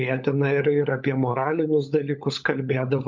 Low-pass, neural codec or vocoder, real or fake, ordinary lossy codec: 7.2 kHz; codec, 16 kHz, 16 kbps, FunCodec, trained on LibriTTS, 50 frames a second; fake; MP3, 64 kbps